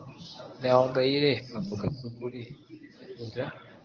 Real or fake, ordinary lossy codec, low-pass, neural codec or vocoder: fake; Opus, 32 kbps; 7.2 kHz; codec, 24 kHz, 0.9 kbps, WavTokenizer, medium speech release version 1